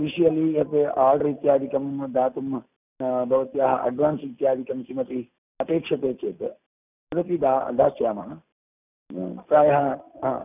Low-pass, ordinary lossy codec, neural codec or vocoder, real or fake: 3.6 kHz; none; none; real